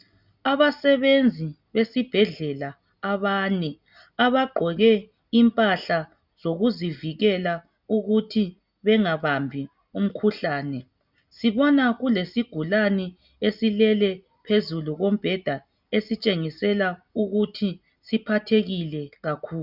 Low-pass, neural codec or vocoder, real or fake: 5.4 kHz; none; real